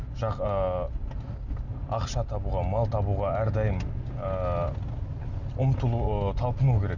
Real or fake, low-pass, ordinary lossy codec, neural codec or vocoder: real; 7.2 kHz; none; none